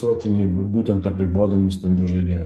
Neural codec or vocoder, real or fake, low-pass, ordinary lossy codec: codec, 44.1 kHz, 2.6 kbps, SNAC; fake; 14.4 kHz; MP3, 64 kbps